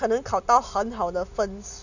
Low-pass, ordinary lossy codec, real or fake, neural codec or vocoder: 7.2 kHz; none; real; none